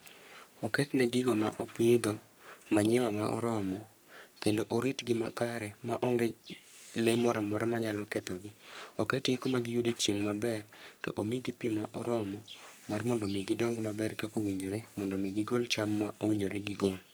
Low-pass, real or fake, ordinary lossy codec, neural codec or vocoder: none; fake; none; codec, 44.1 kHz, 3.4 kbps, Pupu-Codec